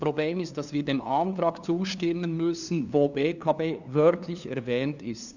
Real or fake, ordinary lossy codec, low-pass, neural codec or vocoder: fake; none; 7.2 kHz; codec, 16 kHz, 2 kbps, FunCodec, trained on LibriTTS, 25 frames a second